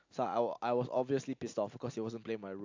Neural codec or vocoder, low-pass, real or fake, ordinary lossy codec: none; 7.2 kHz; real; AAC, 48 kbps